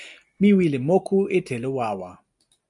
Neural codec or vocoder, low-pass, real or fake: none; 10.8 kHz; real